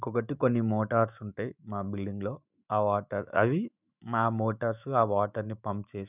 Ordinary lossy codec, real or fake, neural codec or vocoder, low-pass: none; fake; autoencoder, 48 kHz, 128 numbers a frame, DAC-VAE, trained on Japanese speech; 3.6 kHz